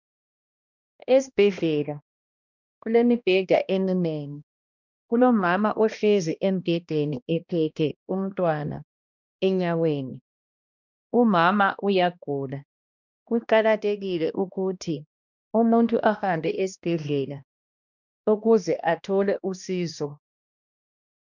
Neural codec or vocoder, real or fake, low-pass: codec, 16 kHz, 1 kbps, X-Codec, HuBERT features, trained on balanced general audio; fake; 7.2 kHz